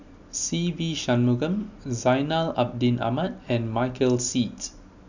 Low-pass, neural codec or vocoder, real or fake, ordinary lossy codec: 7.2 kHz; none; real; none